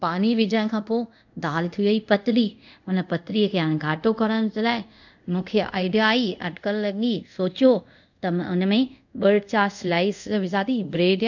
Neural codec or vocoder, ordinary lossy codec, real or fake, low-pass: codec, 24 kHz, 0.5 kbps, DualCodec; none; fake; 7.2 kHz